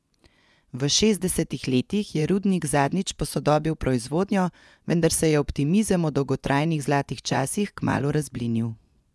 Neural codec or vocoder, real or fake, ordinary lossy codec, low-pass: vocoder, 24 kHz, 100 mel bands, Vocos; fake; none; none